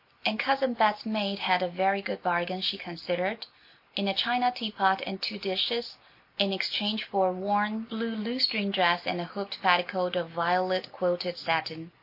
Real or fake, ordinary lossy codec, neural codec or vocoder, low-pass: real; MP3, 32 kbps; none; 5.4 kHz